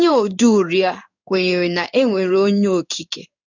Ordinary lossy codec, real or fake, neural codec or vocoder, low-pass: none; fake; codec, 16 kHz in and 24 kHz out, 1 kbps, XY-Tokenizer; 7.2 kHz